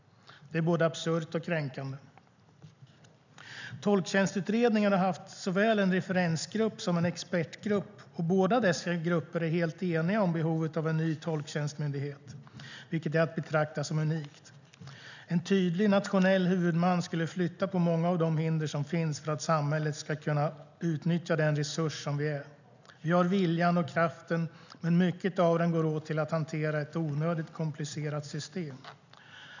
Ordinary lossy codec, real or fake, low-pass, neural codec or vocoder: none; real; 7.2 kHz; none